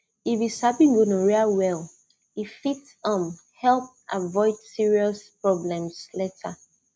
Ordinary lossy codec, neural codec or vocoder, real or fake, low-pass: none; none; real; none